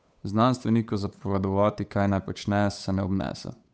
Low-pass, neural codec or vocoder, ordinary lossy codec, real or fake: none; codec, 16 kHz, 8 kbps, FunCodec, trained on Chinese and English, 25 frames a second; none; fake